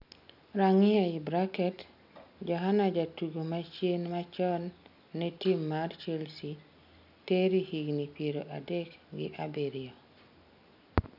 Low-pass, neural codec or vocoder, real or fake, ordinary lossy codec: 5.4 kHz; none; real; none